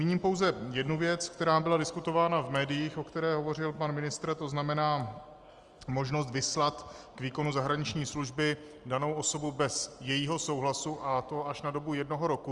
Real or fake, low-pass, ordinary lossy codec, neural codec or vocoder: real; 10.8 kHz; Opus, 32 kbps; none